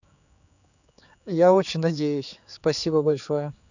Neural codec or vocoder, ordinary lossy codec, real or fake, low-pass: codec, 16 kHz, 4 kbps, X-Codec, HuBERT features, trained on general audio; none; fake; 7.2 kHz